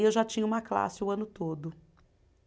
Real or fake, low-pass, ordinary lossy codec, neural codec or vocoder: real; none; none; none